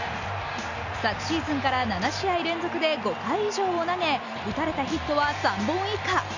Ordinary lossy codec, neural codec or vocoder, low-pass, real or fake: none; none; 7.2 kHz; real